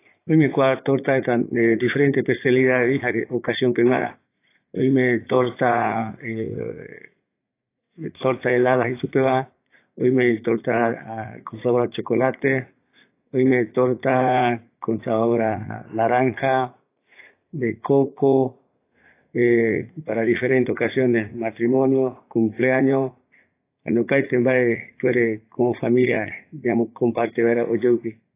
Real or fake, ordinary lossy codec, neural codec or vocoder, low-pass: fake; AAC, 24 kbps; vocoder, 44.1 kHz, 80 mel bands, Vocos; 3.6 kHz